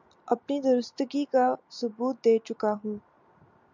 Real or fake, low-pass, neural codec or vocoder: real; 7.2 kHz; none